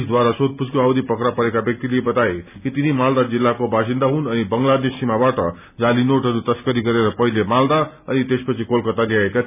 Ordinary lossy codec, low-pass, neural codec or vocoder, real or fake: none; 3.6 kHz; none; real